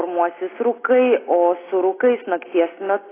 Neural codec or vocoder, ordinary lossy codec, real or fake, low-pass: none; AAC, 16 kbps; real; 3.6 kHz